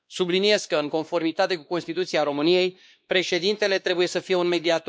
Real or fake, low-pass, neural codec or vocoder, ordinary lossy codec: fake; none; codec, 16 kHz, 2 kbps, X-Codec, WavLM features, trained on Multilingual LibriSpeech; none